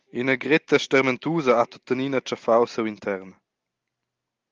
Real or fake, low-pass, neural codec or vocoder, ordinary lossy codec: real; 7.2 kHz; none; Opus, 32 kbps